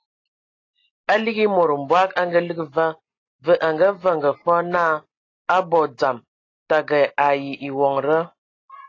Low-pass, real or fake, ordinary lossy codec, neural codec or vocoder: 7.2 kHz; real; AAC, 32 kbps; none